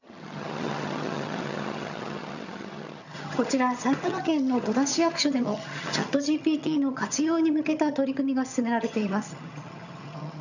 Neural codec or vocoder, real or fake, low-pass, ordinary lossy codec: vocoder, 22.05 kHz, 80 mel bands, HiFi-GAN; fake; 7.2 kHz; none